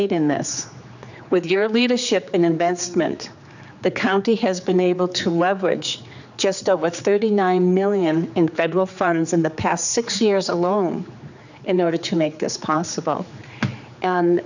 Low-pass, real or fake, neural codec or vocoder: 7.2 kHz; fake; codec, 16 kHz, 4 kbps, X-Codec, HuBERT features, trained on general audio